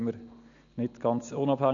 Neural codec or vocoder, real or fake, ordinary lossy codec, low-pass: none; real; none; 7.2 kHz